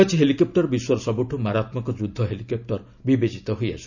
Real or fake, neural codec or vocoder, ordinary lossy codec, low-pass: real; none; none; 7.2 kHz